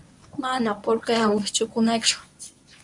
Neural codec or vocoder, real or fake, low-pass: codec, 24 kHz, 0.9 kbps, WavTokenizer, medium speech release version 1; fake; 10.8 kHz